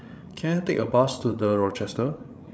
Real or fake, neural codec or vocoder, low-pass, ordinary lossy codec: fake; codec, 16 kHz, 16 kbps, FreqCodec, larger model; none; none